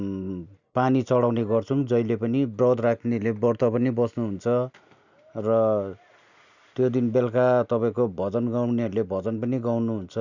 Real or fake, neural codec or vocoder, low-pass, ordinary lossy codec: real; none; 7.2 kHz; none